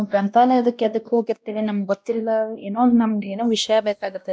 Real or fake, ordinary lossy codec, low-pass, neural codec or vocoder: fake; none; none; codec, 16 kHz, 1 kbps, X-Codec, WavLM features, trained on Multilingual LibriSpeech